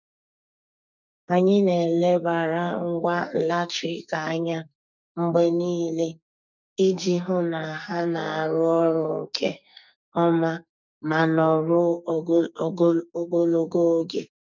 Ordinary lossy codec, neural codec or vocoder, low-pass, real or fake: none; codec, 44.1 kHz, 2.6 kbps, SNAC; 7.2 kHz; fake